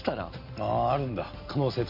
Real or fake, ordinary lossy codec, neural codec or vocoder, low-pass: real; MP3, 32 kbps; none; 5.4 kHz